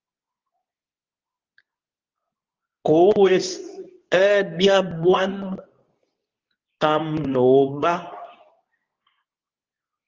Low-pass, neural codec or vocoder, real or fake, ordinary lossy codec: 7.2 kHz; codec, 24 kHz, 0.9 kbps, WavTokenizer, medium speech release version 2; fake; Opus, 32 kbps